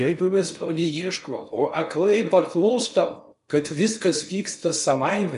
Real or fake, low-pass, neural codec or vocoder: fake; 10.8 kHz; codec, 16 kHz in and 24 kHz out, 0.6 kbps, FocalCodec, streaming, 4096 codes